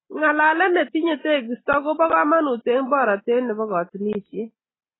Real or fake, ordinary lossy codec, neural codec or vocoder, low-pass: real; AAC, 16 kbps; none; 7.2 kHz